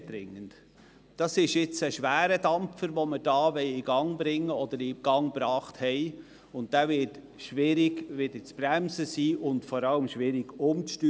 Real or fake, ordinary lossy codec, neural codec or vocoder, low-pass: real; none; none; none